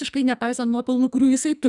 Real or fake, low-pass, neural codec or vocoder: fake; 10.8 kHz; codec, 44.1 kHz, 2.6 kbps, SNAC